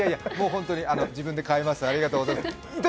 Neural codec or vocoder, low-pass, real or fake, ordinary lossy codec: none; none; real; none